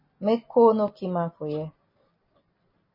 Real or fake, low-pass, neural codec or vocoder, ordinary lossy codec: real; 5.4 kHz; none; MP3, 24 kbps